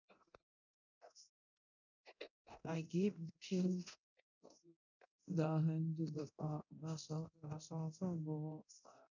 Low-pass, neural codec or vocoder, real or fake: 7.2 kHz; codec, 24 kHz, 0.9 kbps, DualCodec; fake